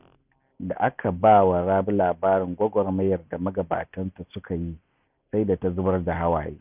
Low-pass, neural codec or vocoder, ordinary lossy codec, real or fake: 3.6 kHz; none; none; real